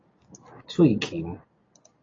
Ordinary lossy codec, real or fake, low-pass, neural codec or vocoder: AAC, 32 kbps; real; 7.2 kHz; none